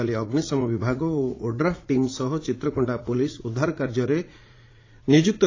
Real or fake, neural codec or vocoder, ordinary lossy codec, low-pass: fake; vocoder, 44.1 kHz, 80 mel bands, Vocos; AAC, 32 kbps; 7.2 kHz